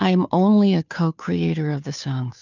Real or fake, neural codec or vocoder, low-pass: fake; codec, 16 kHz, 2 kbps, FunCodec, trained on Chinese and English, 25 frames a second; 7.2 kHz